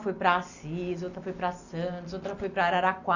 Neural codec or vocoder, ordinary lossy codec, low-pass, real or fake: none; none; 7.2 kHz; real